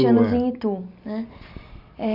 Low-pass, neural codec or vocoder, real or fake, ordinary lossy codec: 5.4 kHz; none; real; none